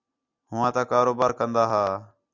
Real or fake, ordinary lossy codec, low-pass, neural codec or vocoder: real; Opus, 64 kbps; 7.2 kHz; none